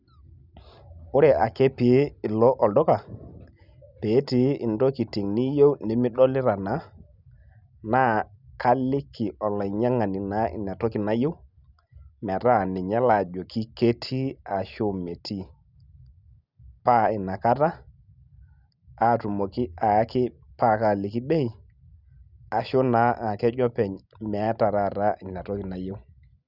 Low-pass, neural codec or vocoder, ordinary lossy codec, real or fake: 5.4 kHz; none; none; real